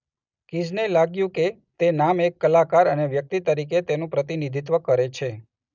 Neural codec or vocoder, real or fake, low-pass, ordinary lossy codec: none; real; 7.2 kHz; none